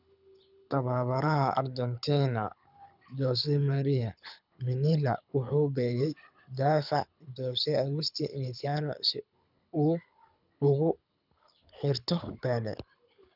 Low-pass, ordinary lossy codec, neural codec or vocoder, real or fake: 5.4 kHz; none; codec, 24 kHz, 6 kbps, HILCodec; fake